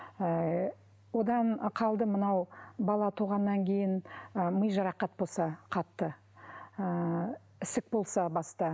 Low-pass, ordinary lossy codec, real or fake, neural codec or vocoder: none; none; real; none